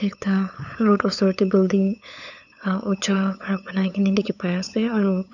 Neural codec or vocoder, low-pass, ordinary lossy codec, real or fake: codec, 16 kHz, 8 kbps, FunCodec, trained on LibriTTS, 25 frames a second; 7.2 kHz; none; fake